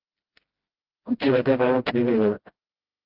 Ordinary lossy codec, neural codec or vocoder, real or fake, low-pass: Opus, 16 kbps; codec, 16 kHz, 0.5 kbps, FreqCodec, smaller model; fake; 5.4 kHz